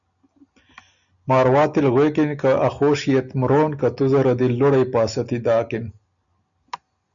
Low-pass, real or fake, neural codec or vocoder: 7.2 kHz; real; none